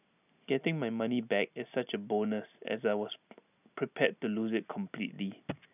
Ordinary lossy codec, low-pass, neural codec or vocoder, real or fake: none; 3.6 kHz; none; real